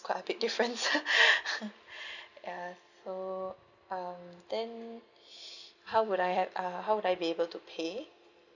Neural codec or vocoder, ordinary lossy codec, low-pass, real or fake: none; none; 7.2 kHz; real